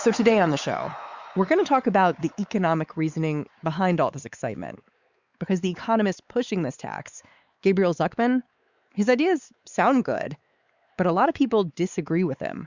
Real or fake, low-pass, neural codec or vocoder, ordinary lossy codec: fake; 7.2 kHz; codec, 16 kHz, 4 kbps, X-Codec, WavLM features, trained on Multilingual LibriSpeech; Opus, 64 kbps